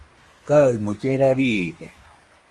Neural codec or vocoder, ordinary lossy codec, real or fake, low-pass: codec, 24 kHz, 1 kbps, SNAC; Opus, 32 kbps; fake; 10.8 kHz